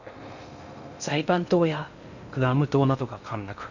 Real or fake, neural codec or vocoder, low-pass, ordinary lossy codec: fake; codec, 16 kHz in and 24 kHz out, 0.6 kbps, FocalCodec, streaming, 2048 codes; 7.2 kHz; none